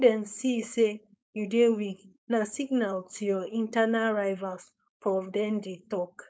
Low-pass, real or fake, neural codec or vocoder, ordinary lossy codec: none; fake; codec, 16 kHz, 4.8 kbps, FACodec; none